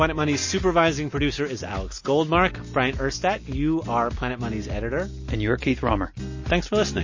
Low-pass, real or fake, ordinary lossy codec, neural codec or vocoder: 7.2 kHz; real; MP3, 32 kbps; none